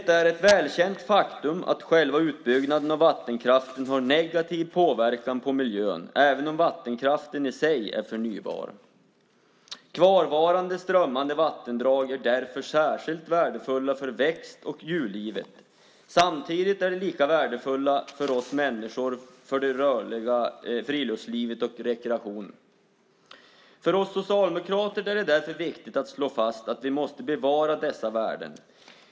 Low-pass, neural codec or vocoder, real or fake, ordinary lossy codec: none; none; real; none